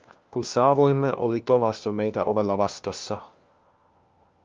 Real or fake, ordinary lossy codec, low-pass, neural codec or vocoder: fake; Opus, 32 kbps; 7.2 kHz; codec, 16 kHz, 1 kbps, FunCodec, trained on LibriTTS, 50 frames a second